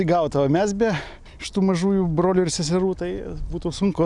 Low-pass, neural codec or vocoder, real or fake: 10.8 kHz; none; real